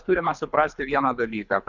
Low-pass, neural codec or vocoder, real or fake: 7.2 kHz; codec, 24 kHz, 3 kbps, HILCodec; fake